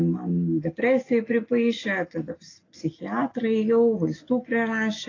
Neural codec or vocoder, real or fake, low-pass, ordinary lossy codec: none; real; 7.2 kHz; AAC, 32 kbps